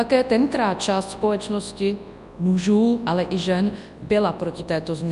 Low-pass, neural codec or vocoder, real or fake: 10.8 kHz; codec, 24 kHz, 0.9 kbps, WavTokenizer, large speech release; fake